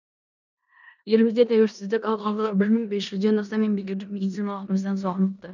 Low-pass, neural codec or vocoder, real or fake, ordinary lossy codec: 7.2 kHz; codec, 16 kHz in and 24 kHz out, 0.9 kbps, LongCat-Audio-Codec, four codebook decoder; fake; none